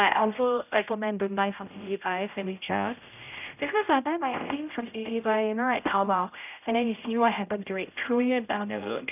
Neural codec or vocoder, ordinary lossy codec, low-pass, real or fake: codec, 16 kHz, 0.5 kbps, X-Codec, HuBERT features, trained on general audio; none; 3.6 kHz; fake